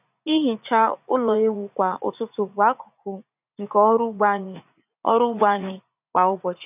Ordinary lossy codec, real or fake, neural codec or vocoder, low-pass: none; fake; vocoder, 44.1 kHz, 80 mel bands, Vocos; 3.6 kHz